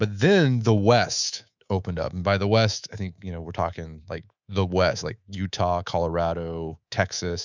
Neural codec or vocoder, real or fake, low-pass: codec, 24 kHz, 3.1 kbps, DualCodec; fake; 7.2 kHz